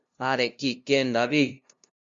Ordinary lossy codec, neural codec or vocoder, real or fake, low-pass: Opus, 64 kbps; codec, 16 kHz, 0.5 kbps, FunCodec, trained on LibriTTS, 25 frames a second; fake; 7.2 kHz